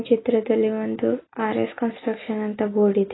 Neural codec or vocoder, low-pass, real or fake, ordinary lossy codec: none; 7.2 kHz; real; AAC, 16 kbps